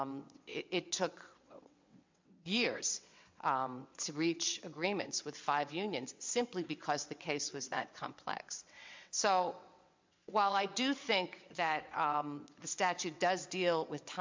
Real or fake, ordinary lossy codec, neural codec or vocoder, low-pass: fake; MP3, 64 kbps; vocoder, 22.05 kHz, 80 mel bands, WaveNeXt; 7.2 kHz